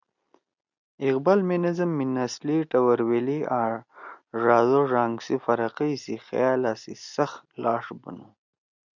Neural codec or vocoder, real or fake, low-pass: none; real; 7.2 kHz